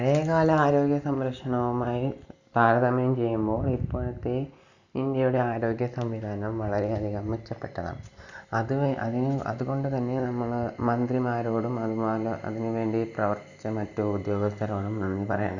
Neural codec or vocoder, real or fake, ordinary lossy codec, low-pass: none; real; none; 7.2 kHz